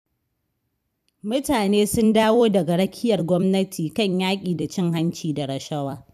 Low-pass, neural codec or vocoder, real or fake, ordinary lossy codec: 14.4 kHz; vocoder, 44.1 kHz, 128 mel bands every 256 samples, BigVGAN v2; fake; none